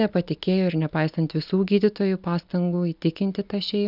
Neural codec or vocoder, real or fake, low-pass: none; real; 5.4 kHz